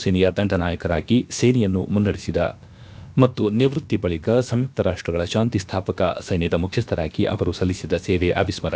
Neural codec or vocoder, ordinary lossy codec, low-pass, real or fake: codec, 16 kHz, about 1 kbps, DyCAST, with the encoder's durations; none; none; fake